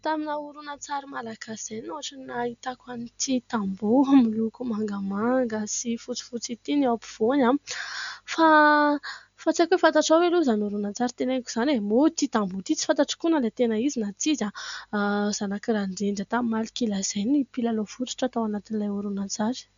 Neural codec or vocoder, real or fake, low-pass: none; real; 7.2 kHz